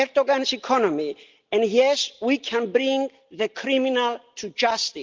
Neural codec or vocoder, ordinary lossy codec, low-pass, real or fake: none; Opus, 32 kbps; 7.2 kHz; real